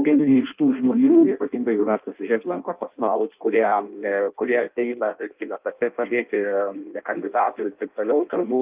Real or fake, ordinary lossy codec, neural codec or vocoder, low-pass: fake; Opus, 24 kbps; codec, 16 kHz in and 24 kHz out, 0.6 kbps, FireRedTTS-2 codec; 3.6 kHz